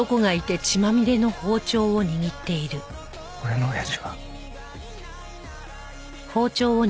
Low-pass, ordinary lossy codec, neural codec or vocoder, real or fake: none; none; none; real